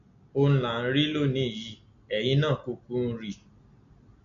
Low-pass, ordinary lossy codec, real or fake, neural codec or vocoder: 7.2 kHz; none; real; none